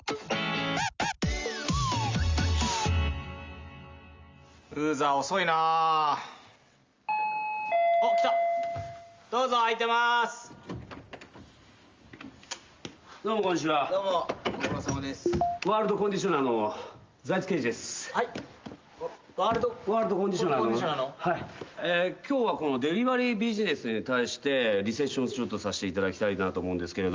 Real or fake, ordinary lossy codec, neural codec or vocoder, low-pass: real; Opus, 32 kbps; none; 7.2 kHz